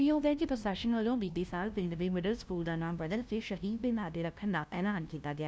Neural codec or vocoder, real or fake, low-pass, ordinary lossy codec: codec, 16 kHz, 0.5 kbps, FunCodec, trained on LibriTTS, 25 frames a second; fake; none; none